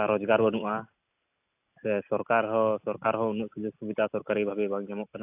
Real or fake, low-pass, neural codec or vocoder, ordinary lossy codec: real; 3.6 kHz; none; AAC, 32 kbps